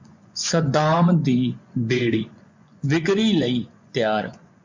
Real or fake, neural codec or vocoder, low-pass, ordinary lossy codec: fake; vocoder, 24 kHz, 100 mel bands, Vocos; 7.2 kHz; MP3, 64 kbps